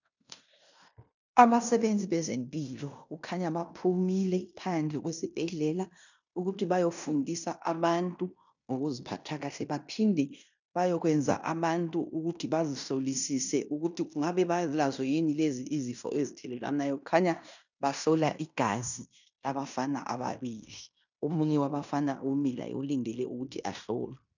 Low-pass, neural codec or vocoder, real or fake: 7.2 kHz; codec, 16 kHz in and 24 kHz out, 0.9 kbps, LongCat-Audio-Codec, fine tuned four codebook decoder; fake